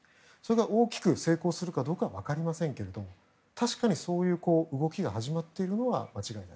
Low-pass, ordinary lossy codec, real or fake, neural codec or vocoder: none; none; real; none